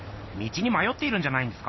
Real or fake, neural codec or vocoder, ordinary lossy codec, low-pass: real; none; MP3, 24 kbps; 7.2 kHz